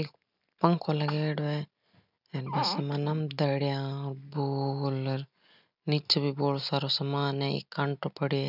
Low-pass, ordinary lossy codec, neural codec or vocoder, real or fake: 5.4 kHz; none; none; real